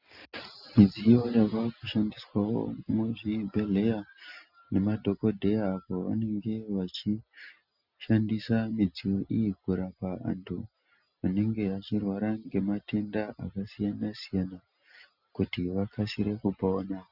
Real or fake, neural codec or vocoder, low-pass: real; none; 5.4 kHz